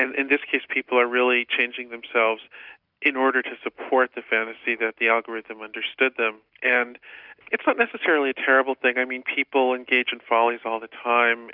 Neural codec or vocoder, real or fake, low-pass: none; real; 5.4 kHz